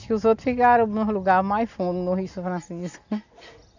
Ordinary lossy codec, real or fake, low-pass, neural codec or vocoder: none; real; 7.2 kHz; none